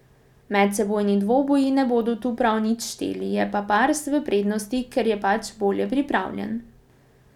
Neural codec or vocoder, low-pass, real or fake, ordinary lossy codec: none; 19.8 kHz; real; none